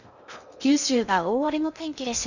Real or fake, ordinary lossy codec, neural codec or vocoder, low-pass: fake; none; codec, 16 kHz in and 24 kHz out, 0.6 kbps, FocalCodec, streaming, 2048 codes; 7.2 kHz